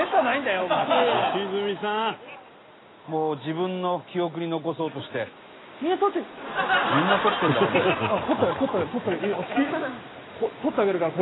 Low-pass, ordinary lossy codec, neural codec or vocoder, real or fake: 7.2 kHz; AAC, 16 kbps; none; real